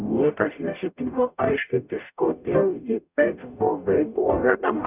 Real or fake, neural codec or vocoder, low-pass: fake; codec, 44.1 kHz, 0.9 kbps, DAC; 3.6 kHz